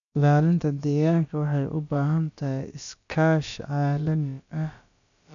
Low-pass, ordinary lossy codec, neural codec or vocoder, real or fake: 7.2 kHz; none; codec, 16 kHz, about 1 kbps, DyCAST, with the encoder's durations; fake